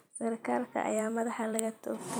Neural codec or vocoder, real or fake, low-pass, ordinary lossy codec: vocoder, 44.1 kHz, 128 mel bands every 256 samples, BigVGAN v2; fake; none; none